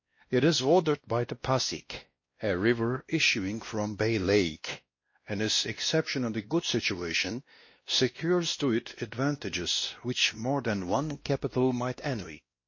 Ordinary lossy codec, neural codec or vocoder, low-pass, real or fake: MP3, 32 kbps; codec, 16 kHz, 1 kbps, X-Codec, WavLM features, trained on Multilingual LibriSpeech; 7.2 kHz; fake